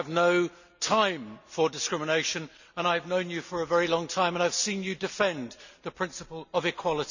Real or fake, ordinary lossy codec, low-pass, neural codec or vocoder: real; none; 7.2 kHz; none